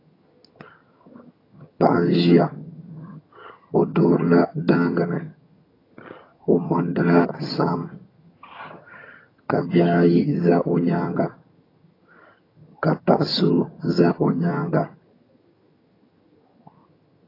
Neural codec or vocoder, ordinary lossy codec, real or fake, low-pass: vocoder, 22.05 kHz, 80 mel bands, HiFi-GAN; AAC, 24 kbps; fake; 5.4 kHz